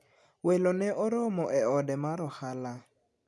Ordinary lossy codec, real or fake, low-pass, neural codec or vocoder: none; real; none; none